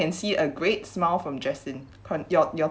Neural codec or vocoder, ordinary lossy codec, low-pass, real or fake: none; none; none; real